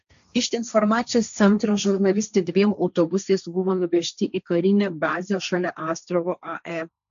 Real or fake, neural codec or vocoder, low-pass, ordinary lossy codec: fake; codec, 16 kHz, 1.1 kbps, Voila-Tokenizer; 7.2 kHz; AAC, 96 kbps